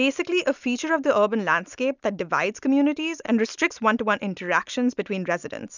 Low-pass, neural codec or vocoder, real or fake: 7.2 kHz; none; real